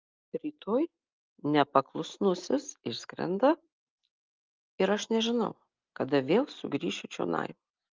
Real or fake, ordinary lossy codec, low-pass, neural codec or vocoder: real; Opus, 32 kbps; 7.2 kHz; none